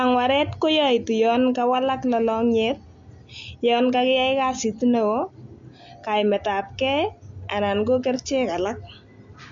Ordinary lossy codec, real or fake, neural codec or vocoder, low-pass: MP3, 48 kbps; real; none; 7.2 kHz